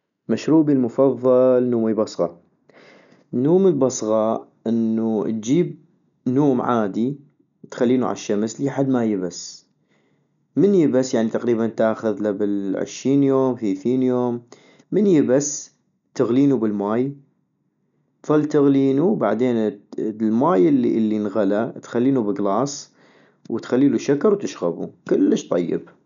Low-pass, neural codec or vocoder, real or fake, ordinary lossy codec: 7.2 kHz; none; real; none